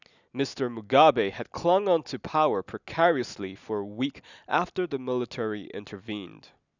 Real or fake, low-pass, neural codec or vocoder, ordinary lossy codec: real; 7.2 kHz; none; none